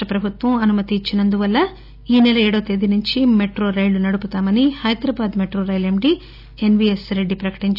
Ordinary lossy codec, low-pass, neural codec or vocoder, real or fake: none; 5.4 kHz; none; real